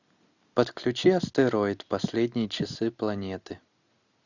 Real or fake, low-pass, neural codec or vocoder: real; 7.2 kHz; none